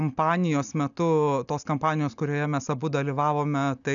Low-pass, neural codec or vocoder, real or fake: 7.2 kHz; none; real